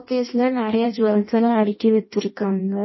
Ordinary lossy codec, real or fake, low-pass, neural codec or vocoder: MP3, 24 kbps; fake; 7.2 kHz; codec, 16 kHz in and 24 kHz out, 0.6 kbps, FireRedTTS-2 codec